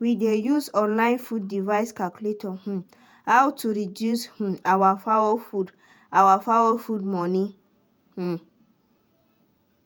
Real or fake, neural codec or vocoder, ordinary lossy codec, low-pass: fake; vocoder, 48 kHz, 128 mel bands, Vocos; none; none